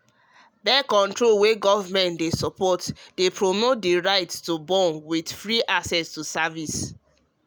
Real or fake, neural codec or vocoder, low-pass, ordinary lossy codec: real; none; none; none